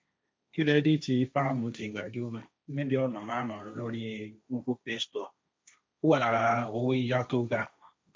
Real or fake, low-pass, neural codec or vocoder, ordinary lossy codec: fake; none; codec, 16 kHz, 1.1 kbps, Voila-Tokenizer; none